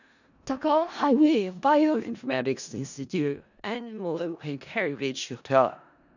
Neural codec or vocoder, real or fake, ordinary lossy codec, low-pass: codec, 16 kHz in and 24 kHz out, 0.4 kbps, LongCat-Audio-Codec, four codebook decoder; fake; none; 7.2 kHz